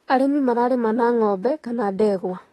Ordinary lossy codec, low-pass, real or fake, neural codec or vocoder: AAC, 32 kbps; 19.8 kHz; fake; autoencoder, 48 kHz, 32 numbers a frame, DAC-VAE, trained on Japanese speech